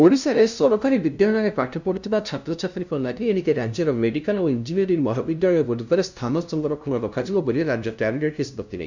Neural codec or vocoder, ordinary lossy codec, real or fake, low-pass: codec, 16 kHz, 0.5 kbps, FunCodec, trained on LibriTTS, 25 frames a second; none; fake; 7.2 kHz